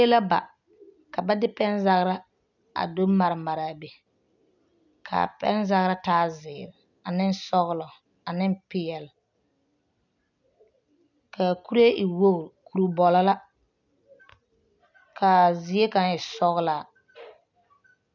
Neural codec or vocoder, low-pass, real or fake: none; 7.2 kHz; real